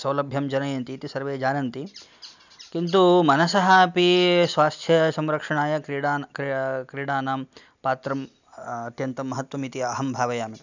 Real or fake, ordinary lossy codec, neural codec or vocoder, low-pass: real; none; none; 7.2 kHz